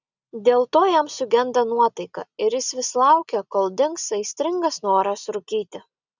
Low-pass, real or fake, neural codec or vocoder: 7.2 kHz; real; none